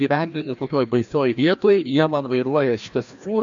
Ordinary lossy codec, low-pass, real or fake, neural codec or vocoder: AAC, 64 kbps; 7.2 kHz; fake; codec, 16 kHz, 1 kbps, FreqCodec, larger model